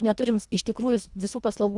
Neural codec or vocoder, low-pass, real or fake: codec, 24 kHz, 1.5 kbps, HILCodec; 10.8 kHz; fake